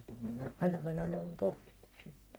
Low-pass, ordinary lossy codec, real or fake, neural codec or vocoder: none; none; fake; codec, 44.1 kHz, 1.7 kbps, Pupu-Codec